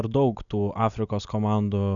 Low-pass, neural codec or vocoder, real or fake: 7.2 kHz; none; real